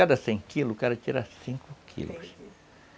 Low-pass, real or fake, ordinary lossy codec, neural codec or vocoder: none; real; none; none